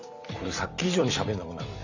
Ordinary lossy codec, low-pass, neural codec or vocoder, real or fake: none; 7.2 kHz; vocoder, 44.1 kHz, 128 mel bands every 256 samples, BigVGAN v2; fake